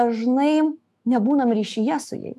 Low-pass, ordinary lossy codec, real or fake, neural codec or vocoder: 14.4 kHz; MP3, 96 kbps; fake; autoencoder, 48 kHz, 128 numbers a frame, DAC-VAE, trained on Japanese speech